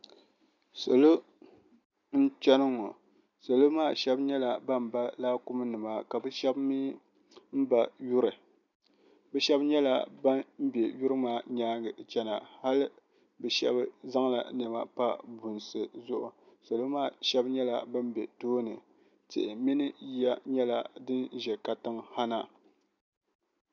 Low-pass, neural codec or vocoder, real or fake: 7.2 kHz; none; real